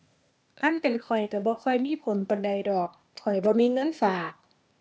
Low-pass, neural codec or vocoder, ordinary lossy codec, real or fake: none; codec, 16 kHz, 0.8 kbps, ZipCodec; none; fake